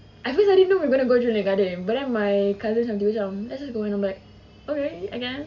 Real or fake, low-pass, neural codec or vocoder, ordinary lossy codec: real; 7.2 kHz; none; none